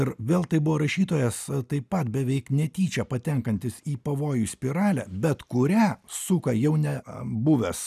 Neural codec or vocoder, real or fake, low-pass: none; real; 14.4 kHz